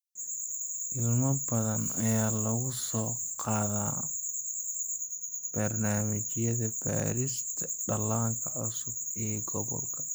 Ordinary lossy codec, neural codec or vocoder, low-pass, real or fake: none; none; none; real